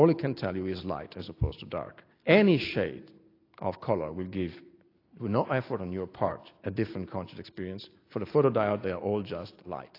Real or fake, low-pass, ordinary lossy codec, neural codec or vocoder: real; 5.4 kHz; AAC, 32 kbps; none